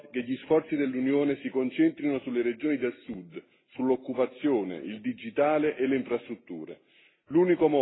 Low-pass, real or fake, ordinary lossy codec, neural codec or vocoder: 7.2 kHz; real; AAC, 16 kbps; none